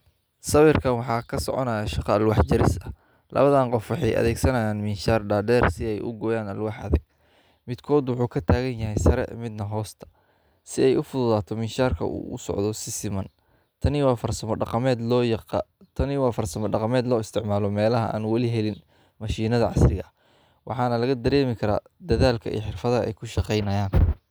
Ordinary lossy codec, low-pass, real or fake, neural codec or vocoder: none; none; real; none